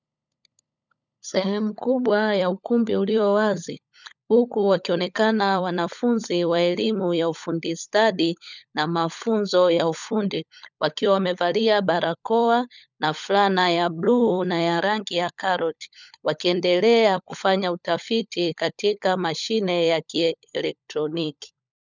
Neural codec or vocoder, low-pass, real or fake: codec, 16 kHz, 16 kbps, FunCodec, trained on LibriTTS, 50 frames a second; 7.2 kHz; fake